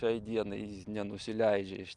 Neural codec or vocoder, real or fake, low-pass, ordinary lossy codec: none; real; 10.8 kHz; Opus, 32 kbps